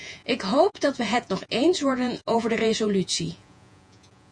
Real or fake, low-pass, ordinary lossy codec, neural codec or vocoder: fake; 9.9 kHz; MP3, 64 kbps; vocoder, 48 kHz, 128 mel bands, Vocos